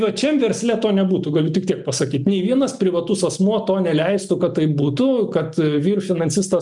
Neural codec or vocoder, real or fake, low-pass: none; real; 10.8 kHz